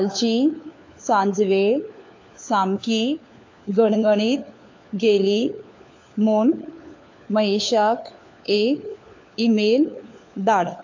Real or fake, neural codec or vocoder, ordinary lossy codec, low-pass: fake; codec, 16 kHz, 4 kbps, FunCodec, trained on LibriTTS, 50 frames a second; AAC, 48 kbps; 7.2 kHz